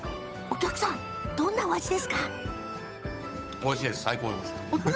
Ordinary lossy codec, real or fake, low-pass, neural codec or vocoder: none; fake; none; codec, 16 kHz, 8 kbps, FunCodec, trained on Chinese and English, 25 frames a second